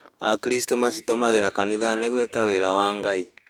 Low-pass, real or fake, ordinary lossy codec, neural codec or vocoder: 19.8 kHz; fake; none; codec, 44.1 kHz, 2.6 kbps, DAC